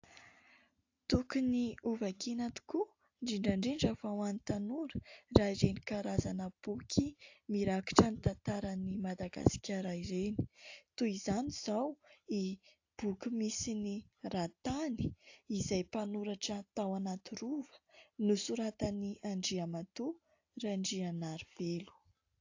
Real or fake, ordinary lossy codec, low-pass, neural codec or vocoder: real; AAC, 48 kbps; 7.2 kHz; none